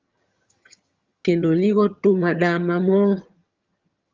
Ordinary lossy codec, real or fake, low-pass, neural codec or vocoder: Opus, 32 kbps; fake; 7.2 kHz; vocoder, 22.05 kHz, 80 mel bands, HiFi-GAN